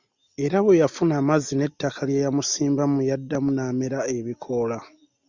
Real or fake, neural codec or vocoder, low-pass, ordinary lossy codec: real; none; 7.2 kHz; Opus, 64 kbps